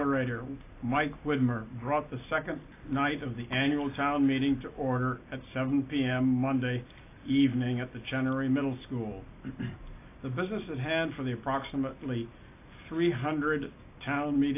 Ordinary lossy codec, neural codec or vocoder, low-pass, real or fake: AAC, 24 kbps; none; 3.6 kHz; real